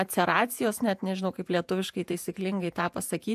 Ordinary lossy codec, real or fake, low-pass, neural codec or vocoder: AAC, 96 kbps; fake; 14.4 kHz; vocoder, 48 kHz, 128 mel bands, Vocos